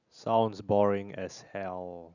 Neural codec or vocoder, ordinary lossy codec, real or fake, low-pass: none; none; real; 7.2 kHz